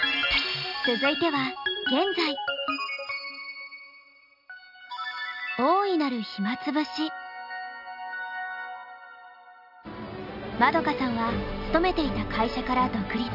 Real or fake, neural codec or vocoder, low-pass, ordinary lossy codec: real; none; 5.4 kHz; none